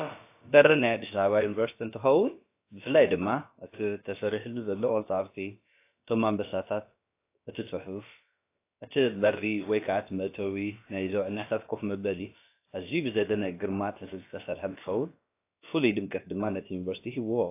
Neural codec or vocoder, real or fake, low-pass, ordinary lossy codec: codec, 16 kHz, about 1 kbps, DyCAST, with the encoder's durations; fake; 3.6 kHz; AAC, 24 kbps